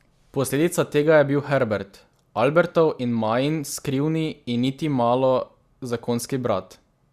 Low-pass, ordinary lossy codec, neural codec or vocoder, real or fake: 14.4 kHz; Opus, 64 kbps; none; real